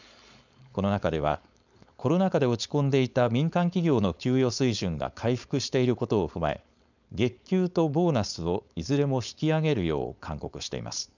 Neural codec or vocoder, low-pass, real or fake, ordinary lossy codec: codec, 16 kHz, 4.8 kbps, FACodec; 7.2 kHz; fake; none